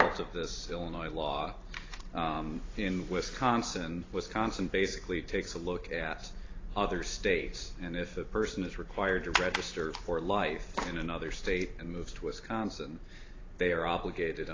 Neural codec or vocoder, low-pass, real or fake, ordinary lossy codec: vocoder, 44.1 kHz, 128 mel bands every 512 samples, BigVGAN v2; 7.2 kHz; fake; AAC, 32 kbps